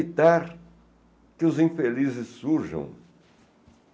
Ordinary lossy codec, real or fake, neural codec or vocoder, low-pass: none; real; none; none